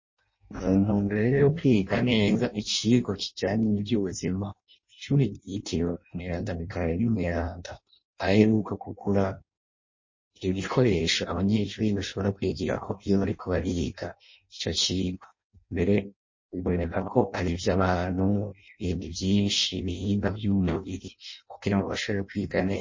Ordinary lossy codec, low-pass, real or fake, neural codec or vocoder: MP3, 32 kbps; 7.2 kHz; fake; codec, 16 kHz in and 24 kHz out, 0.6 kbps, FireRedTTS-2 codec